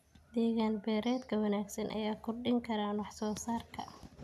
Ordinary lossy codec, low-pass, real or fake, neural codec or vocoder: none; 14.4 kHz; real; none